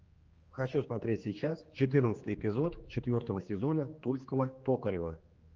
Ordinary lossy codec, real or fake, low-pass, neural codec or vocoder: Opus, 24 kbps; fake; 7.2 kHz; codec, 16 kHz, 2 kbps, X-Codec, HuBERT features, trained on general audio